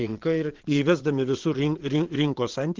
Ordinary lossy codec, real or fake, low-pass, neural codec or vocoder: Opus, 16 kbps; fake; 7.2 kHz; vocoder, 22.05 kHz, 80 mel bands, WaveNeXt